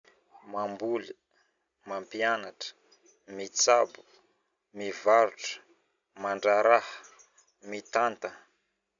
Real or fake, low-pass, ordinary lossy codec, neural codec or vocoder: real; 7.2 kHz; none; none